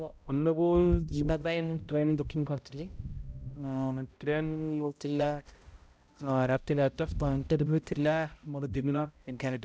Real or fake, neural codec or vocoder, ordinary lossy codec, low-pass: fake; codec, 16 kHz, 0.5 kbps, X-Codec, HuBERT features, trained on balanced general audio; none; none